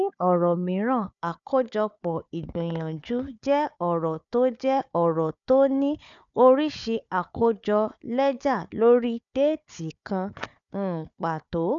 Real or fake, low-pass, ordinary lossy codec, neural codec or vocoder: fake; 7.2 kHz; none; codec, 16 kHz, 4 kbps, FunCodec, trained on Chinese and English, 50 frames a second